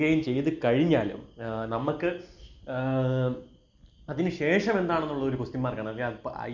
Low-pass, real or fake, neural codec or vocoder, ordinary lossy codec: 7.2 kHz; real; none; none